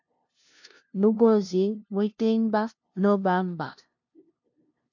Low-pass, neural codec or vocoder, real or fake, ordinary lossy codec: 7.2 kHz; codec, 16 kHz, 0.5 kbps, FunCodec, trained on LibriTTS, 25 frames a second; fake; MP3, 48 kbps